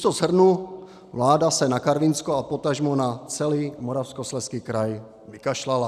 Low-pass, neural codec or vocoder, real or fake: 14.4 kHz; none; real